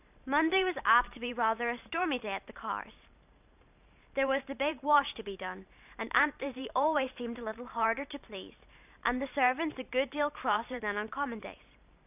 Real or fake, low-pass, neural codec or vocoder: fake; 3.6 kHz; vocoder, 44.1 kHz, 128 mel bands every 512 samples, BigVGAN v2